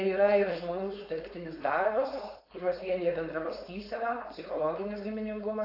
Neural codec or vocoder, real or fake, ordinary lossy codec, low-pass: codec, 16 kHz, 4.8 kbps, FACodec; fake; AAC, 32 kbps; 5.4 kHz